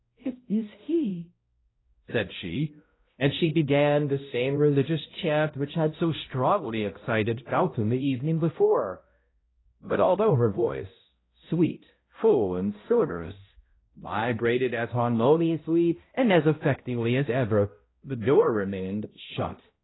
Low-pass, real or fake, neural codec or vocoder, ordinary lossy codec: 7.2 kHz; fake; codec, 16 kHz, 0.5 kbps, X-Codec, HuBERT features, trained on balanced general audio; AAC, 16 kbps